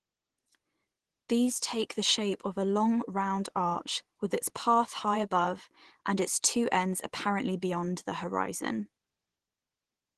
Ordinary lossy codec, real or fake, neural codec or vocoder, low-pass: Opus, 16 kbps; fake; vocoder, 24 kHz, 100 mel bands, Vocos; 10.8 kHz